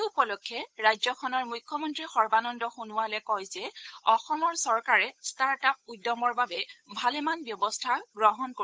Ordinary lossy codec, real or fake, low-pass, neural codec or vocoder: none; fake; none; codec, 16 kHz, 8 kbps, FunCodec, trained on Chinese and English, 25 frames a second